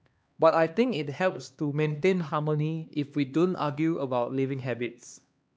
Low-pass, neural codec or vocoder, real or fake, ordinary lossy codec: none; codec, 16 kHz, 2 kbps, X-Codec, HuBERT features, trained on LibriSpeech; fake; none